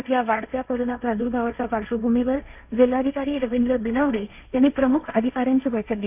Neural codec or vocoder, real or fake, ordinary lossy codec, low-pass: codec, 16 kHz, 1.1 kbps, Voila-Tokenizer; fake; AAC, 32 kbps; 3.6 kHz